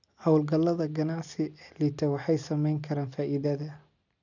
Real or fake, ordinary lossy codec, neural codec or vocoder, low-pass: real; none; none; 7.2 kHz